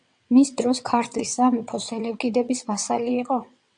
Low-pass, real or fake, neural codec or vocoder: 9.9 kHz; fake; vocoder, 22.05 kHz, 80 mel bands, WaveNeXt